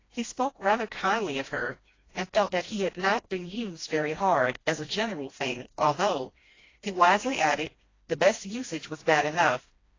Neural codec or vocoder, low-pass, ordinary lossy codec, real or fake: codec, 16 kHz, 1 kbps, FreqCodec, smaller model; 7.2 kHz; AAC, 32 kbps; fake